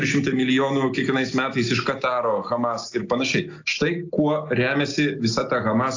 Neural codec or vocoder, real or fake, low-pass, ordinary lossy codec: none; real; 7.2 kHz; AAC, 48 kbps